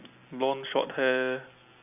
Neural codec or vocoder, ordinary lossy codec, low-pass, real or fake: none; none; 3.6 kHz; real